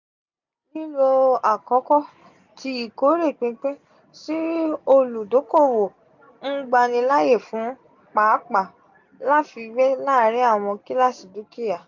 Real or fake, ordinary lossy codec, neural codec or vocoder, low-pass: real; none; none; 7.2 kHz